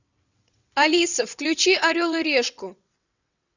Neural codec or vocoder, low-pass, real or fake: vocoder, 22.05 kHz, 80 mel bands, WaveNeXt; 7.2 kHz; fake